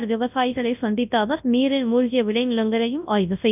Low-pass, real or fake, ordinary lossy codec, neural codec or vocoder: 3.6 kHz; fake; none; codec, 24 kHz, 0.9 kbps, WavTokenizer, large speech release